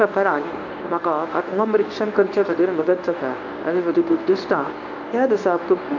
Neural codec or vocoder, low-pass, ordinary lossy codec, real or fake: codec, 24 kHz, 0.9 kbps, WavTokenizer, medium speech release version 1; 7.2 kHz; none; fake